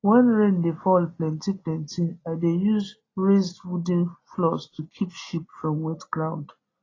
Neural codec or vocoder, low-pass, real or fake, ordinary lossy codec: none; 7.2 kHz; real; AAC, 32 kbps